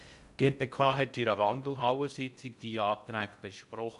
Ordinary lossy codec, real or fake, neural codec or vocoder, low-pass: none; fake; codec, 16 kHz in and 24 kHz out, 0.6 kbps, FocalCodec, streaming, 2048 codes; 10.8 kHz